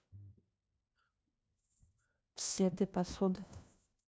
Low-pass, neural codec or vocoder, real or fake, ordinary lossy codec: none; codec, 16 kHz, 1 kbps, FunCodec, trained on LibriTTS, 50 frames a second; fake; none